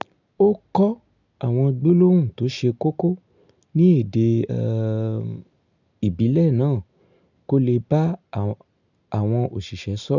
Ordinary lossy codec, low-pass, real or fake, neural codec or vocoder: none; 7.2 kHz; real; none